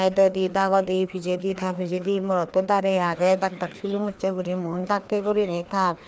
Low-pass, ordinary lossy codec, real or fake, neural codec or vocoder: none; none; fake; codec, 16 kHz, 2 kbps, FreqCodec, larger model